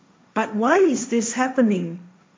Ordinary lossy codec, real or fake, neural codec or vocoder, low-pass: none; fake; codec, 16 kHz, 1.1 kbps, Voila-Tokenizer; none